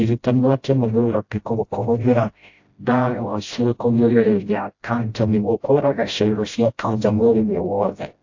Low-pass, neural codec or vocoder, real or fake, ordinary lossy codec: 7.2 kHz; codec, 16 kHz, 0.5 kbps, FreqCodec, smaller model; fake; AAC, 48 kbps